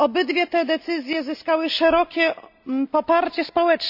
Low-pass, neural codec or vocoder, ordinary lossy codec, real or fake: 5.4 kHz; none; AAC, 48 kbps; real